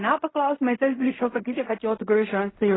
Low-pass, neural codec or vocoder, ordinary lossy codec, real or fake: 7.2 kHz; codec, 16 kHz in and 24 kHz out, 0.4 kbps, LongCat-Audio-Codec, fine tuned four codebook decoder; AAC, 16 kbps; fake